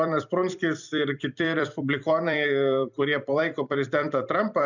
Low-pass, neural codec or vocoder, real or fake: 7.2 kHz; vocoder, 44.1 kHz, 128 mel bands every 256 samples, BigVGAN v2; fake